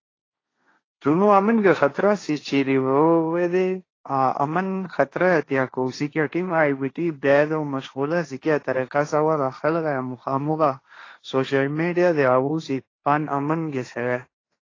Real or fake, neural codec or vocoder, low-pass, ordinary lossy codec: fake; codec, 16 kHz, 1.1 kbps, Voila-Tokenizer; 7.2 kHz; AAC, 32 kbps